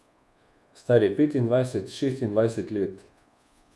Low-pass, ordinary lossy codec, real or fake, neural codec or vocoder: none; none; fake; codec, 24 kHz, 1.2 kbps, DualCodec